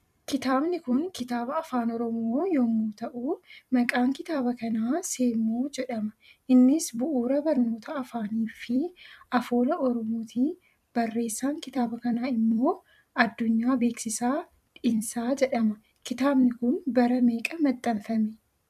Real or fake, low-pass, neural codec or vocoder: fake; 14.4 kHz; vocoder, 44.1 kHz, 128 mel bands every 256 samples, BigVGAN v2